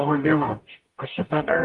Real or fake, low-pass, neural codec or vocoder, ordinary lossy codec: fake; 10.8 kHz; codec, 44.1 kHz, 0.9 kbps, DAC; Opus, 24 kbps